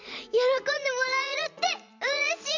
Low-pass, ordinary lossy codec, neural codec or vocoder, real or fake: 7.2 kHz; MP3, 64 kbps; vocoder, 44.1 kHz, 80 mel bands, Vocos; fake